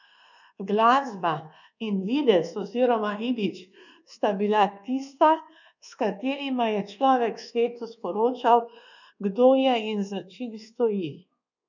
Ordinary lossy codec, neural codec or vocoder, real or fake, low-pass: none; codec, 24 kHz, 1.2 kbps, DualCodec; fake; 7.2 kHz